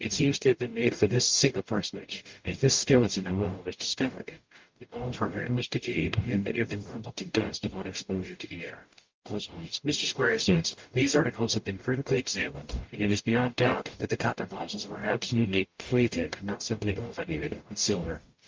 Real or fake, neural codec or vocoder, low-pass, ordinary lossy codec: fake; codec, 44.1 kHz, 0.9 kbps, DAC; 7.2 kHz; Opus, 24 kbps